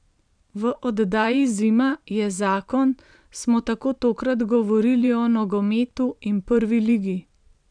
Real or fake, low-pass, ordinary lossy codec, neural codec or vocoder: fake; 9.9 kHz; none; vocoder, 44.1 kHz, 128 mel bands every 512 samples, BigVGAN v2